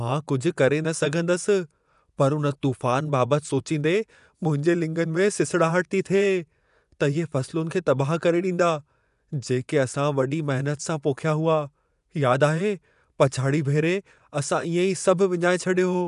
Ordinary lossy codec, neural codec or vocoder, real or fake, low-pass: none; vocoder, 24 kHz, 100 mel bands, Vocos; fake; 10.8 kHz